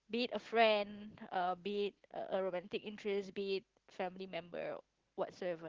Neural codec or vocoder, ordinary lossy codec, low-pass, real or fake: none; Opus, 16 kbps; 7.2 kHz; real